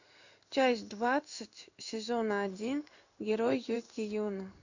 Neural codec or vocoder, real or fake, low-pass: codec, 16 kHz in and 24 kHz out, 1 kbps, XY-Tokenizer; fake; 7.2 kHz